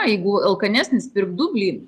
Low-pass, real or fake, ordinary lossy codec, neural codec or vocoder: 14.4 kHz; real; Opus, 32 kbps; none